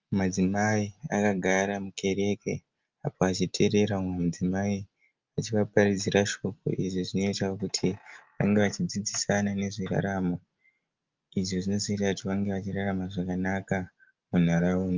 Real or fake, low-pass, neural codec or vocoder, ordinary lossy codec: real; 7.2 kHz; none; Opus, 24 kbps